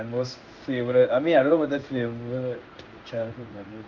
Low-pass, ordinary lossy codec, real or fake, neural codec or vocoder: 7.2 kHz; Opus, 24 kbps; fake; codec, 16 kHz in and 24 kHz out, 1 kbps, XY-Tokenizer